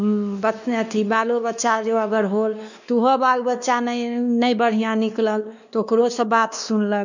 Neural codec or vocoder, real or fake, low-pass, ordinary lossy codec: codec, 16 kHz, 2 kbps, X-Codec, WavLM features, trained on Multilingual LibriSpeech; fake; 7.2 kHz; none